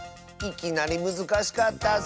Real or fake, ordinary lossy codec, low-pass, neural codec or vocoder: real; none; none; none